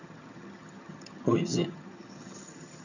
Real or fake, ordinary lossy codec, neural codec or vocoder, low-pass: fake; none; vocoder, 22.05 kHz, 80 mel bands, HiFi-GAN; 7.2 kHz